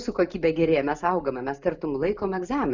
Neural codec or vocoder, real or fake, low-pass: none; real; 7.2 kHz